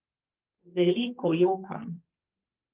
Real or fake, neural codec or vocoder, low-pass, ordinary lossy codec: fake; codec, 44.1 kHz, 2.6 kbps, SNAC; 3.6 kHz; Opus, 24 kbps